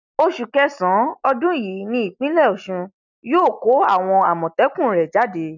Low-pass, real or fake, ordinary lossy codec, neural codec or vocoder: 7.2 kHz; real; none; none